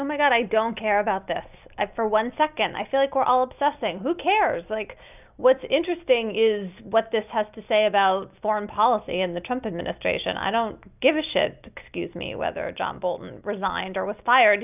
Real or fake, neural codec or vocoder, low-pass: real; none; 3.6 kHz